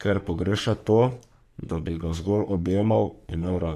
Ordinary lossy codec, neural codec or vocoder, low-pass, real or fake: MP3, 96 kbps; codec, 44.1 kHz, 3.4 kbps, Pupu-Codec; 14.4 kHz; fake